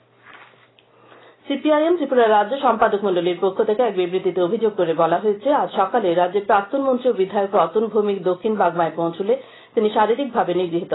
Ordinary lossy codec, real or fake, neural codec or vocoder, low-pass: AAC, 16 kbps; real; none; 7.2 kHz